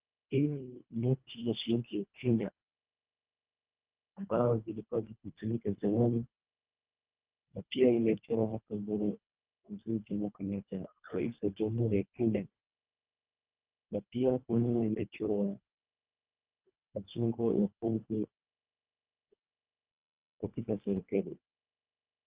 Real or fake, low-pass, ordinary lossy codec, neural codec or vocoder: fake; 3.6 kHz; Opus, 32 kbps; codec, 24 kHz, 1.5 kbps, HILCodec